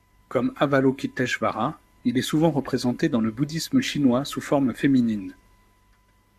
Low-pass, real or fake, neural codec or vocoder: 14.4 kHz; fake; codec, 44.1 kHz, 7.8 kbps, Pupu-Codec